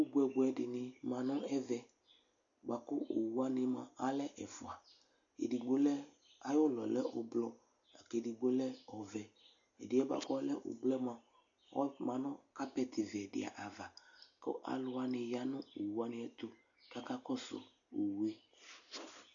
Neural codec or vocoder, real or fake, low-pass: none; real; 7.2 kHz